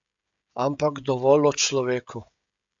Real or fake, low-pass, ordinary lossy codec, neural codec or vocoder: fake; 7.2 kHz; MP3, 64 kbps; codec, 16 kHz, 16 kbps, FreqCodec, smaller model